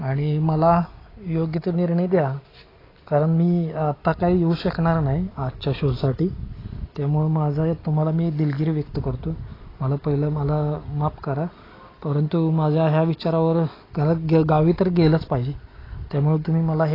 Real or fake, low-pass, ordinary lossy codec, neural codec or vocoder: real; 5.4 kHz; AAC, 24 kbps; none